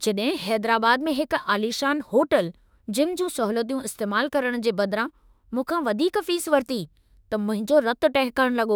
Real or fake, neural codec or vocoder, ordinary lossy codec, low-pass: fake; autoencoder, 48 kHz, 32 numbers a frame, DAC-VAE, trained on Japanese speech; none; none